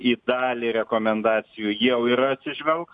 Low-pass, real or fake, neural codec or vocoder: 9.9 kHz; real; none